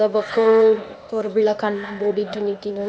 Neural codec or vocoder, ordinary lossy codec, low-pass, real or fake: codec, 16 kHz, 0.8 kbps, ZipCodec; none; none; fake